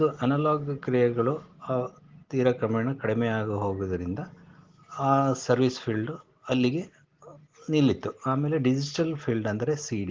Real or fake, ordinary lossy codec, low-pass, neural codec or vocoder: real; Opus, 16 kbps; 7.2 kHz; none